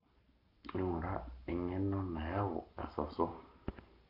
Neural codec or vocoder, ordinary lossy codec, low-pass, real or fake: codec, 44.1 kHz, 7.8 kbps, Pupu-Codec; none; 5.4 kHz; fake